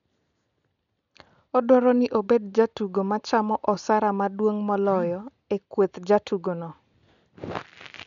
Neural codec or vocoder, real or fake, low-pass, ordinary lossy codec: none; real; 7.2 kHz; none